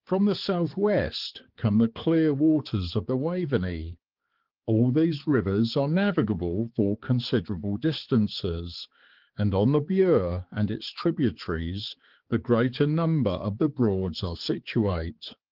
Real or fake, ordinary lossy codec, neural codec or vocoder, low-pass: fake; Opus, 16 kbps; codec, 16 kHz, 4 kbps, X-Codec, HuBERT features, trained on balanced general audio; 5.4 kHz